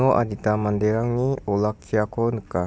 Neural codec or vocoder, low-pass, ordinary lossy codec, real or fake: none; none; none; real